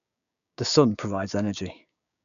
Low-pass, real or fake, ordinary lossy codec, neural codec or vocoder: 7.2 kHz; fake; none; codec, 16 kHz, 6 kbps, DAC